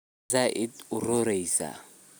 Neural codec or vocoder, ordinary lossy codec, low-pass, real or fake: none; none; none; real